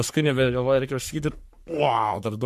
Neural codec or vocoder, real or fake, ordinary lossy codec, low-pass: codec, 44.1 kHz, 3.4 kbps, Pupu-Codec; fake; MP3, 64 kbps; 14.4 kHz